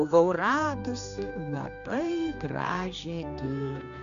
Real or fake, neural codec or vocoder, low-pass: fake; codec, 16 kHz, 2 kbps, X-Codec, HuBERT features, trained on general audio; 7.2 kHz